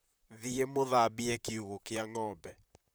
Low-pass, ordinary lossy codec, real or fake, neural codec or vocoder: none; none; fake; vocoder, 44.1 kHz, 128 mel bands, Pupu-Vocoder